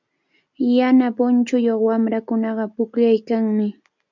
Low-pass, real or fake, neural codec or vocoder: 7.2 kHz; real; none